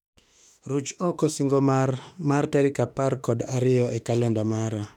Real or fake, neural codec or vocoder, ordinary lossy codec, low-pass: fake; autoencoder, 48 kHz, 32 numbers a frame, DAC-VAE, trained on Japanese speech; none; 19.8 kHz